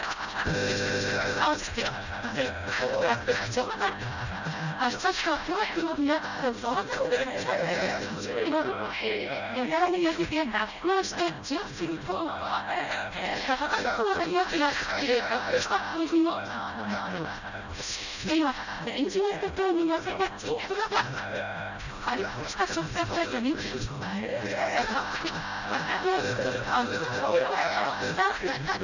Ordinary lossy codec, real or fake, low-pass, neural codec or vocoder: none; fake; 7.2 kHz; codec, 16 kHz, 0.5 kbps, FreqCodec, smaller model